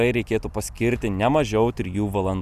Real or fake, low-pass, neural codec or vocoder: real; 14.4 kHz; none